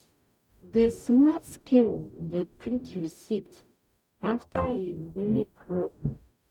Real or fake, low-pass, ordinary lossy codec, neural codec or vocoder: fake; 19.8 kHz; none; codec, 44.1 kHz, 0.9 kbps, DAC